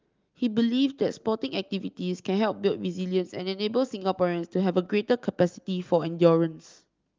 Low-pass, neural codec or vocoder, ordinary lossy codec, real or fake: 7.2 kHz; none; Opus, 32 kbps; real